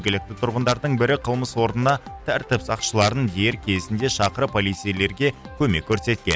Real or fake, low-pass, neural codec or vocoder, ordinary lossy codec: real; none; none; none